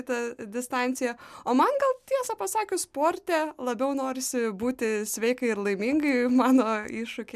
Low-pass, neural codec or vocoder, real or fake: 14.4 kHz; none; real